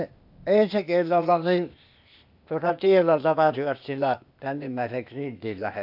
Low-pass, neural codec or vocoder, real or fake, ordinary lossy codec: 5.4 kHz; codec, 16 kHz, 0.8 kbps, ZipCodec; fake; AAC, 48 kbps